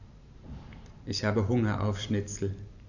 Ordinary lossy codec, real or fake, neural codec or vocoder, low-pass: none; fake; vocoder, 44.1 kHz, 128 mel bands every 512 samples, BigVGAN v2; 7.2 kHz